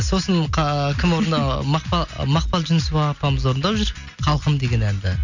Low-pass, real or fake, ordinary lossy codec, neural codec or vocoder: 7.2 kHz; real; none; none